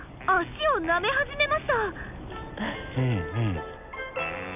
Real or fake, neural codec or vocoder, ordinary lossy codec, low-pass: real; none; none; 3.6 kHz